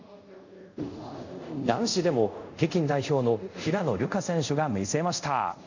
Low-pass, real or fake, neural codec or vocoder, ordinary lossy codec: 7.2 kHz; fake; codec, 24 kHz, 0.5 kbps, DualCodec; none